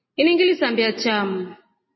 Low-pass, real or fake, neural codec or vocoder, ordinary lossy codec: 7.2 kHz; real; none; MP3, 24 kbps